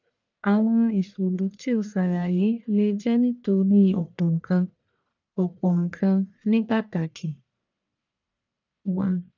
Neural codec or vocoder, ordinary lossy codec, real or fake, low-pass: codec, 44.1 kHz, 1.7 kbps, Pupu-Codec; none; fake; 7.2 kHz